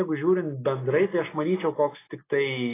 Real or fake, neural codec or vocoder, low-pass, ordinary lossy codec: real; none; 3.6 kHz; AAC, 16 kbps